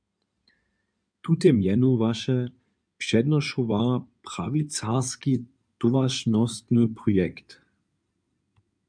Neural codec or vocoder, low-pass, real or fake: codec, 16 kHz in and 24 kHz out, 2.2 kbps, FireRedTTS-2 codec; 9.9 kHz; fake